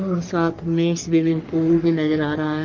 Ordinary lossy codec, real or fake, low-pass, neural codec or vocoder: Opus, 24 kbps; fake; 7.2 kHz; codec, 44.1 kHz, 3.4 kbps, Pupu-Codec